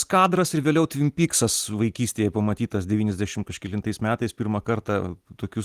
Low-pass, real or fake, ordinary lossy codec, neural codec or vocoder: 14.4 kHz; real; Opus, 24 kbps; none